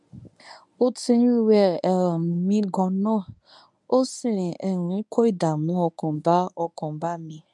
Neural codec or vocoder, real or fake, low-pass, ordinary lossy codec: codec, 24 kHz, 0.9 kbps, WavTokenizer, medium speech release version 1; fake; 10.8 kHz; none